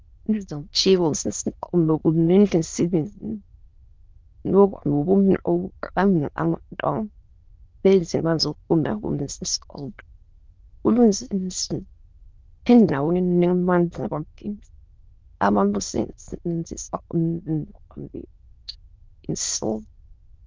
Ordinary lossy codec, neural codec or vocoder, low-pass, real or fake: Opus, 24 kbps; autoencoder, 22.05 kHz, a latent of 192 numbers a frame, VITS, trained on many speakers; 7.2 kHz; fake